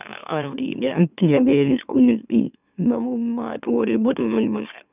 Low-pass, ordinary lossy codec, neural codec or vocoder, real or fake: 3.6 kHz; none; autoencoder, 44.1 kHz, a latent of 192 numbers a frame, MeloTTS; fake